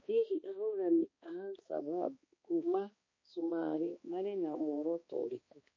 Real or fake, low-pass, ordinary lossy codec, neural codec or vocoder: fake; 7.2 kHz; MP3, 32 kbps; codec, 16 kHz, 2 kbps, X-Codec, HuBERT features, trained on balanced general audio